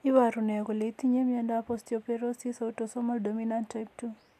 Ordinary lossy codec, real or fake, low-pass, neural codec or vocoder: AAC, 96 kbps; real; 14.4 kHz; none